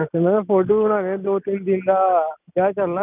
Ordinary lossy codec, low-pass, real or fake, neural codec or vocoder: none; 3.6 kHz; real; none